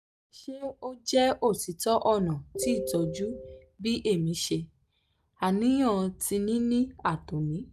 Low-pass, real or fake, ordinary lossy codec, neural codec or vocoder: 14.4 kHz; real; none; none